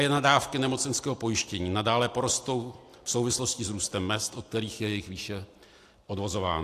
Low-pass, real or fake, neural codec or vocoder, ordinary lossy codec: 14.4 kHz; real; none; AAC, 64 kbps